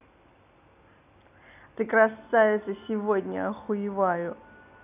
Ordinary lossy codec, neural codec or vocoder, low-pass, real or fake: none; none; 3.6 kHz; real